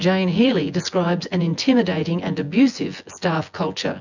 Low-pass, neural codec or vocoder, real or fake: 7.2 kHz; vocoder, 24 kHz, 100 mel bands, Vocos; fake